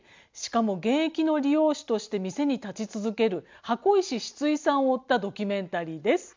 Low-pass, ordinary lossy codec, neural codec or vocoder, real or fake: 7.2 kHz; MP3, 64 kbps; none; real